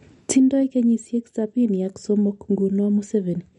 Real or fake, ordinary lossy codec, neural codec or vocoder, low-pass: real; MP3, 48 kbps; none; 19.8 kHz